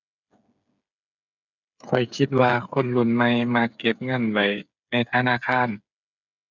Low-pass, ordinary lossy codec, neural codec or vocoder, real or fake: 7.2 kHz; none; codec, 16 kHz, 8 kbps, FreqCodec, smaller model; fake